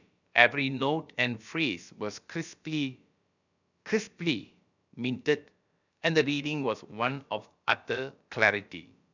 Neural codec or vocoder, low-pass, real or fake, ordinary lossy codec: codec, 16 kHz, about 1 kbps, DyCAST, with the encoder's durations; 7.2 kHz; fake; none